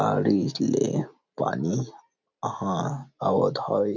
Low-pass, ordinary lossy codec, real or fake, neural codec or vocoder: 7.2 kHz; none; real; none